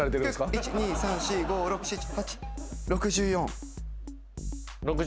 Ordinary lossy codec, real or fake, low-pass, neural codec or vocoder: none; real; none; none